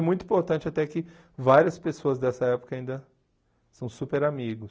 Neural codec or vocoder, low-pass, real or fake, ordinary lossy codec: none; none; real; none